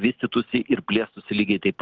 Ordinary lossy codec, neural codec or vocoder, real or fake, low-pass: Opus, 24 kbps; none; real; 7.2 kHz